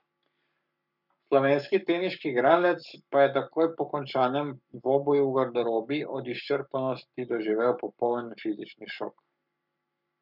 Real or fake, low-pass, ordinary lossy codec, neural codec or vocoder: fake; 5.4 kHz; none; codec, 44.1 kHz, 7.8 kbps, Pupu-Codec